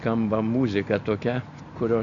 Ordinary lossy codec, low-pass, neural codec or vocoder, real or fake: MP3, 96 kbps; 7.2 kHz; none; real